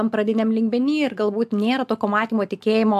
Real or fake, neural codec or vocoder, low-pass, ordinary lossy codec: real; none; 14.4 kHz; AAC, 96 kbps